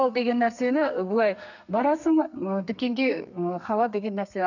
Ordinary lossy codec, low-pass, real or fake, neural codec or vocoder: none; 7.2 kHz; fake; codec, 32 kHz, 1.9 kbps, SNAC